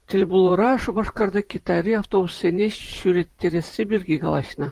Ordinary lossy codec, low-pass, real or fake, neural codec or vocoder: Opus, 24 kbps; 14.4 kHz; fake; vocoder, 44.1 kHz, 128 mel bands, Pupu-Vocoder